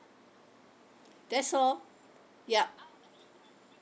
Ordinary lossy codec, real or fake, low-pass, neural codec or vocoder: none; real; none; none